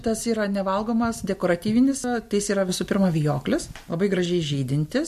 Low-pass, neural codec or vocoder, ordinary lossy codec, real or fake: 14.4 kHz; none; MP3, 64 kbps; real